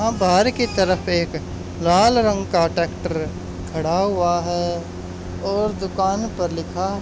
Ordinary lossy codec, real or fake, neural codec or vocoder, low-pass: none; real; none; none